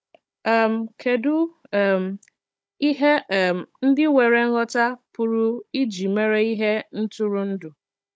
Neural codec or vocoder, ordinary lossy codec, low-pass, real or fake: codec, 16 kHz, 16 kbps, FunCodec, trained on Chinese and English, 50 frames a second; none; none; fake